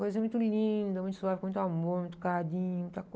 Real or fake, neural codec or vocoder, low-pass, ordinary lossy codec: real; none; none; none